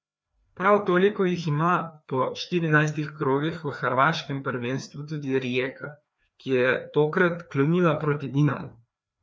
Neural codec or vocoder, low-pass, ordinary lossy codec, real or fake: codec, 16 kHz, 2 kbps, FreqCodec, larger model; none; none; fake